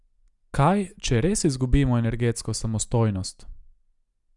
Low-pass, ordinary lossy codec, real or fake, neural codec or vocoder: 10.8 kHz; none; real; none